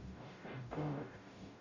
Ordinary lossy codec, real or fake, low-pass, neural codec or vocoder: none; fake; 7.2 kHz; codec, 44.1 kHz, 0.9 kbps, DAC